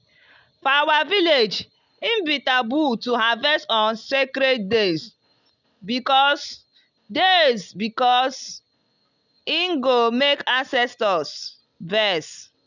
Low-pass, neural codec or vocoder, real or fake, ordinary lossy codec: 7.2 kHz; none; real; none